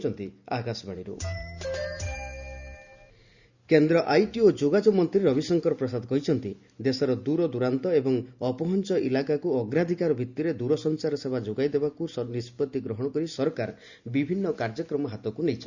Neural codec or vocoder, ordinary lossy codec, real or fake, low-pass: none; Opus, 64 kbps; real; 7.2 kHz